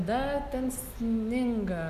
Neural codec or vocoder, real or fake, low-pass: none; real; 14.4 kHz